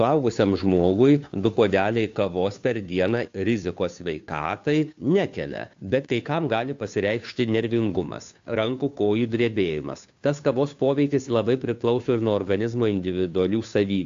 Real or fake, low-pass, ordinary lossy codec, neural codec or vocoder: fake; 7.2 kHz; AAC, 64 kbps; codec, 16 kHz, 2 kbps, FunCodec, trained on Chinese and English, 25 frames a second